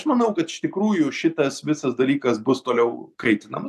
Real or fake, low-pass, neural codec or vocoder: real; 14.4 kHz; none